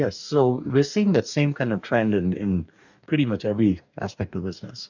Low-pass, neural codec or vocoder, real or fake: 7.2 kHz; codec, 44.1 kHz, 2.6 kbps, DAC; fake